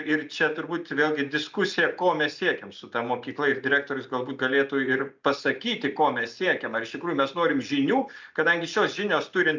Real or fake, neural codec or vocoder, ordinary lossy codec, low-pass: real; none; MP3, 64 kbps; 7.2 kHz